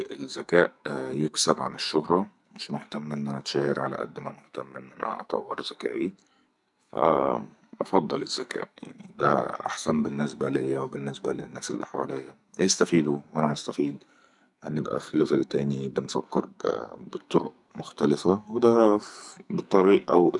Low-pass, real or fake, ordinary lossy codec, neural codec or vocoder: 10.8 kHz; fake; none; codec, 44.1 kHz, 2.6 kbps, SNAC